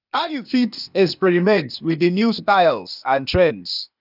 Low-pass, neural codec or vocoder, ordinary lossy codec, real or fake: 5.4 kHz; codec, 16 kHz, 0.8 kbps, ZipCodec; none; fake